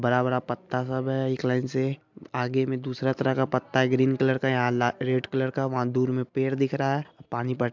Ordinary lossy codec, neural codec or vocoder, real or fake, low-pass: MP3, 64 kbps; none; real; 7.2 kHz